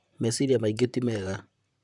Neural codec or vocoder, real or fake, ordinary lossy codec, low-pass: vocoder, 44.1 kHz, 128 mel bands, Pupu-Vocoder; fake; none; 10.8 kHz